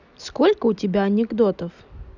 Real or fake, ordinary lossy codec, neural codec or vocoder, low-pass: real; none; none; 7.2 kHz